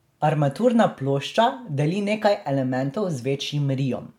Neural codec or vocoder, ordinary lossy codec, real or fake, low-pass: none; MP3, 96 kbps; real; 19.8 kHz